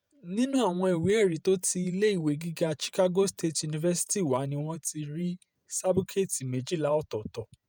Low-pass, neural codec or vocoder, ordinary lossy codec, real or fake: none; vocoder, 48 kHz, 128 mel bands, Vocos; none; fake